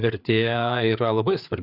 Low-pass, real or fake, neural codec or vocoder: 5.4 kHz; fake; codec, 16 kHz, 4 kbps, FreqCodec, larger model